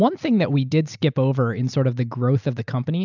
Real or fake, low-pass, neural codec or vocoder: real; 7.2 kHz; none